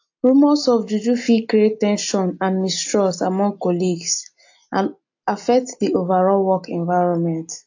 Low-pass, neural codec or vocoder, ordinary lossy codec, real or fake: 7.2 kHz; none; AAC, 48 kbps; real